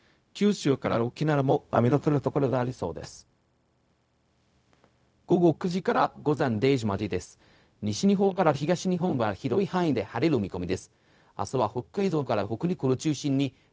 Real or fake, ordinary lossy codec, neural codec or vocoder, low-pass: fake; none; codec, 16 kHz, 0.4 kbps, LongCat-Audio-Codec; none